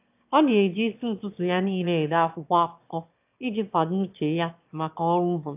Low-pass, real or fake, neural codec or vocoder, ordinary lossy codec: 3.6 kHz; fake; autoencoder, 22.05 kHz, a latent of 192 numbers a frame, VITS, trained on one speaker; AAC, 32 kbps